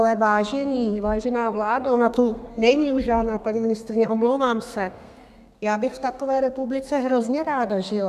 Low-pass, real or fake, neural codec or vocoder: 14.4 kHz; fake; codec, 32 kHz, 1.9 kbps, SNAC